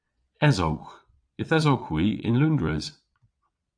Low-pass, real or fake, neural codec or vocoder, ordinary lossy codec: 9.9 kHz; fake; vocoder, 22.05 kHz, 80 mel bands, Vocos; AAC, 64 kbps